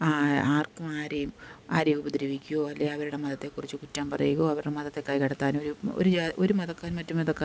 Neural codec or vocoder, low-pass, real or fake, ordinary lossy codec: none; none; real; none